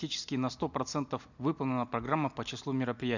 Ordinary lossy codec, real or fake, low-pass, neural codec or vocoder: none; real; 7.2 kHz; none